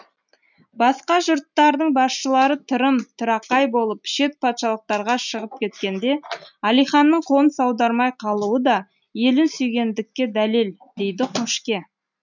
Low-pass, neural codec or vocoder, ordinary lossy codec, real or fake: 7.2 kHz; none; none; real